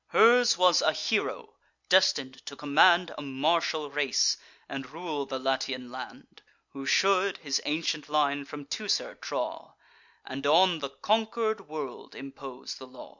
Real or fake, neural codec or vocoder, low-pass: real; none; 7.2 kHz